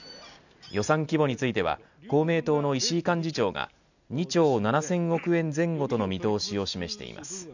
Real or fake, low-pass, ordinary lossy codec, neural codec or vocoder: real; 7.2 kHz; none; none